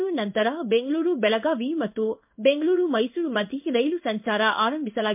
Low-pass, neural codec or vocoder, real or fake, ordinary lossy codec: 3.6 kHz; codec, 16 kHz in and 24 kHz out, 1 kbps, XY-Tokenizer; fake; MP3, 32 kbps